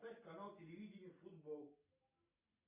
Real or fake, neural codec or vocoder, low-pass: real; none; 3.6 kHz